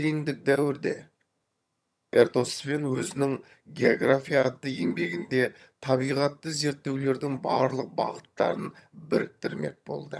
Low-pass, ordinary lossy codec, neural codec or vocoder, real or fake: none; none; vocoder, 22.05 kHz, 80 mel bands, HiFi-GAN; fake